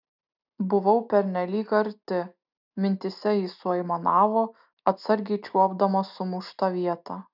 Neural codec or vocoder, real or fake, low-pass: none; real; 5.4 kHz